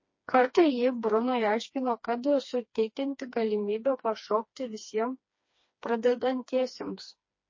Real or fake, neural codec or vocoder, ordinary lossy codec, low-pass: fake; codec, 16 kHz, 2 kbps, FreqCodec, smaller model; MP3, 32 kbps; 7.2 kHz